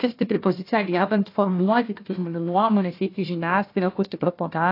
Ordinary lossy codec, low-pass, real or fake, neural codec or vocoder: AAC, 32 kbps; 5.4 kHz; fake; codec, 16 kHz, 1 kbps, FunCodec, trained on Chinese and English, 50 frames a second